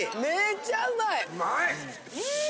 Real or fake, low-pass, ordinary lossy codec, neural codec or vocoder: real; none; none; none